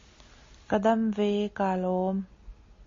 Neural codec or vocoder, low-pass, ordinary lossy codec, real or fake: none; 7.2 kHz; MP3, 32 kbps; real